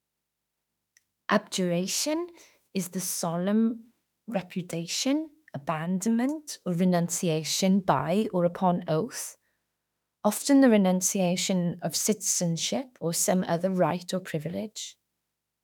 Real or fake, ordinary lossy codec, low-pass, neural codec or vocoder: fake; none; 19.8 kHz; autoencoder, 48 kHz, 32 numbers a frame, DAC-VAE, trained on Japanese speech